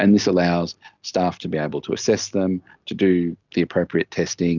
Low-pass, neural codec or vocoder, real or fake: 7.2 kHz; none; real